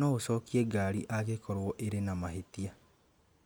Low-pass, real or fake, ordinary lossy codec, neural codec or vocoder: none; real; none; none